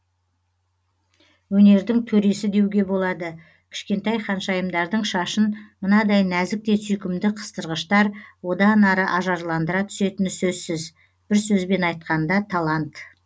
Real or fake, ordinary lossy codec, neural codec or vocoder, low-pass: real; none; none; none